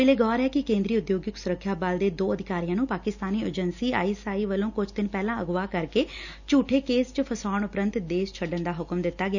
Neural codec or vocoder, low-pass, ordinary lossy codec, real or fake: none; 7.2 kHz; none; real